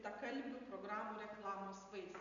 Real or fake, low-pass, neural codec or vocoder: real; 7.2 kHz; none